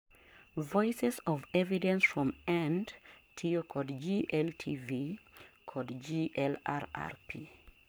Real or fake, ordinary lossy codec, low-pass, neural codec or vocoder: fake; none; none; codec, 44.1 kHz, 7.8 kbps, Pupu-Codec